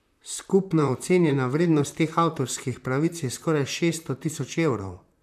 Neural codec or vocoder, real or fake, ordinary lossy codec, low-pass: vocoder, 44.1 kHz, 128 mel bands, Pupu-Vocoder; fake; none; 14.4 kHz